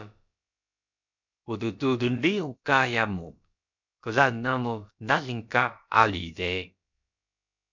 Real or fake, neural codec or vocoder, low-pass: fake; codec, 16 kHz, about 1 kbps, DyCAST, with the encoder's durations; 7.2 kHz